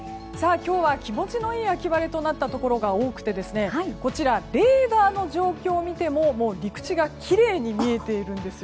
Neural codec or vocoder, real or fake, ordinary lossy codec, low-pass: none; real; none; none